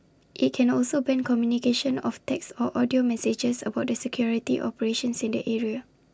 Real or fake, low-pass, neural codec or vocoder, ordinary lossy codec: real; none; none; none